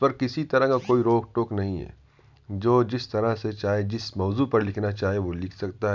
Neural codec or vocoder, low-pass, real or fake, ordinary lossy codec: none; 7.2 kHz; real; none